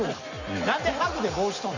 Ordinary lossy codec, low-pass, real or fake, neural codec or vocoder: Opus, 64 kbps; 7.2 kHz; real; none